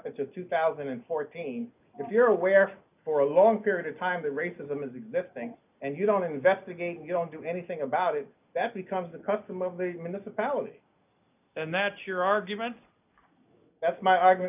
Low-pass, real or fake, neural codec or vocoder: 3.6 kHz; real; none